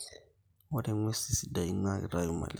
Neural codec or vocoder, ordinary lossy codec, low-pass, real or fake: none; none; none; real